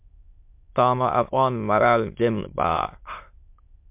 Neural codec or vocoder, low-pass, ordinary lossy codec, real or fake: autoencoder, 22.05 kHz, a latent of 192 numbers a frame, VITS, trained on many speakers; 3.6 kHz; MP3, 32 kbps; fake